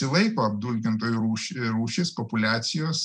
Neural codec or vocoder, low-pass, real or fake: none; 9.9 kHz; real